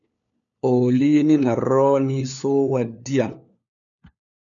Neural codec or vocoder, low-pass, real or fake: codec, 16 kHz, 4 kbps, FunCodec, trained on LibriTTS, 50 frames a second; 7.2 kHz; fake